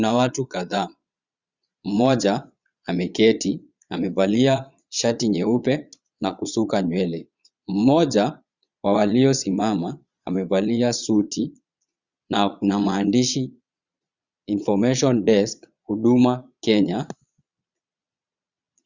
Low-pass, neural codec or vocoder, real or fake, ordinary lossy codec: 7.2 kHz; vocoder, 22.05 kHz, 80 mel bands, Vocos; fake; Opus, 64 kbps